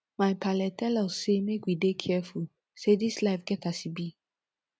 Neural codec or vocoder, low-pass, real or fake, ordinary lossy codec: none; none; real; none